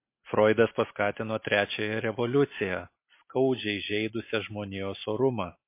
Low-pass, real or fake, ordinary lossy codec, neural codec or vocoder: 3.6 kHz; real; MP3, 24 kbps; none